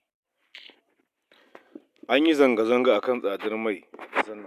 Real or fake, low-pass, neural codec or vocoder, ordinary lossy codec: real; 14.4 kHz; none; none